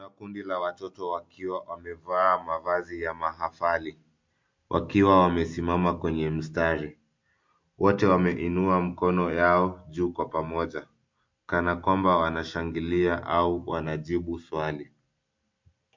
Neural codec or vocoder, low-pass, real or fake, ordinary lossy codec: none; 7.2 kHz; real; MP3, 48 kbps